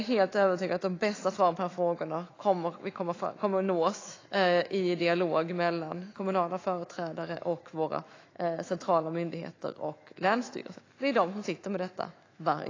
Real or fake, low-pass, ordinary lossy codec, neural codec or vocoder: fake; 7.2 kHz; AAC, 32 kbps; autoencoder, 48 kHz, 128 numbers a frame, DAC-VAE, trained on Japanese speech